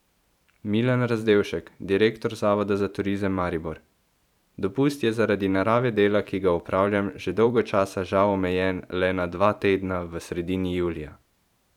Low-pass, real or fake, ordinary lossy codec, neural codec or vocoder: 19.8 kHz; fake; none; vocoder, 44.1 kHz, 128 mel bands every 512 samples, BigVGAN v2